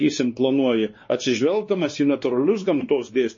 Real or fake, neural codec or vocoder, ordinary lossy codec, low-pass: fake; codec, 16 kHz, 2 kbps, X-Codec, WavLM features, trained on Multilingual LibriSpeech; MP3, 32 kbps; 7.2 kHz